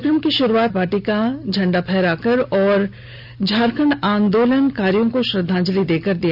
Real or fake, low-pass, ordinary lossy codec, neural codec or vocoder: real; 5.4 kHz; none; none